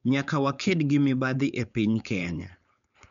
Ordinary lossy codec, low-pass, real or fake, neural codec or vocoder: none; 7.2 kHz; fake; codec, 16 kHz, 4.8 kbps, FACodec